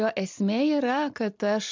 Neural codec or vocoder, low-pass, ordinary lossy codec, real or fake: none; 7.2 kHz; MP3, 64 kbps; real